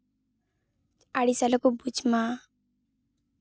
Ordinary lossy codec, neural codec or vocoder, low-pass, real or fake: none; none; none; real